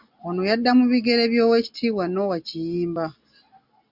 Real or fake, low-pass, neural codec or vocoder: real; 5.4 kHz; none